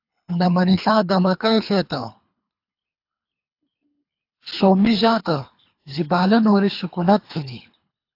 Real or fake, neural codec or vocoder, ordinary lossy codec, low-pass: fake; codec, 24 kHz, 3 kbps, HILCodec; AAC, 32 kbps; 5.4 kHz